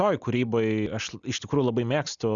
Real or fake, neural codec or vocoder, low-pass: real; none; 7.2 kHz